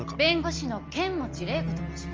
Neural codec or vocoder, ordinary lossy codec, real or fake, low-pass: none; Opus, 24 kbps; real; 7.2 kHz